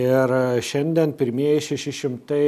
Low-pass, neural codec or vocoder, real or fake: 14.4 kHz; none; real